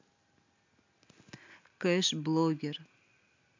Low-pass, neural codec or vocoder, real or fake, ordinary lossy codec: 7.2 kHz; none; real; MP3, 64 kbps